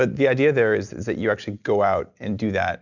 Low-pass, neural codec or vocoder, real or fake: 7.2 kHz; none; real